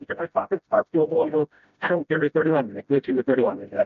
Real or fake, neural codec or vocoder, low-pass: fake; codec, 16 kHz, 0.5 kbps, FreqCodec, smaller model; 7.2 kHz